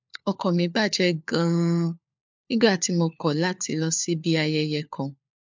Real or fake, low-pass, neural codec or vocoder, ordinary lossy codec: fake; 7.2 kHz; codec, 16 kHz, 4 kbps, FunCodec, trained on LibriTTS, 50 frames a second; MP3, 64 kbps